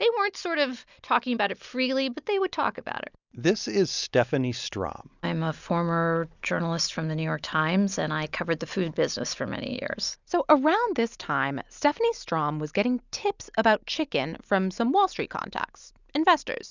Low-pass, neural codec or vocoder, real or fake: 7.2 kHz; none; real